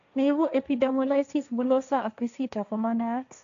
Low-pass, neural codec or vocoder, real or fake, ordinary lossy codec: 7.2 kHz; codec, 16 kHz, 1.1 kbps, Voila-Tokenizer; fake; none